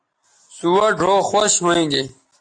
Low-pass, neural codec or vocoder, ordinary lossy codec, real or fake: 9.9 kHz; none; AAC, 48 kbps; real